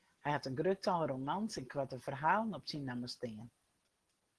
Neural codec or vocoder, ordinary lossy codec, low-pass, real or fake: none; Opus, 16 kbps; 10.8 kHz; real